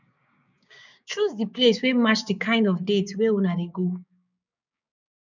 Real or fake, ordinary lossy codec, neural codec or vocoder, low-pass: fake; none; codec, 16 kHz, 6 kbps, DAC; 7.2 kHz